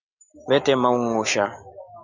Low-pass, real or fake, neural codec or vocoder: 7.2 kHz; real; none